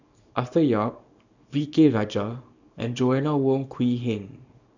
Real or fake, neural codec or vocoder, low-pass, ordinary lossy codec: fake; codec, 24 kHz, 0.9 kbps, WavTokenizer, small release; 7.2 kHz; none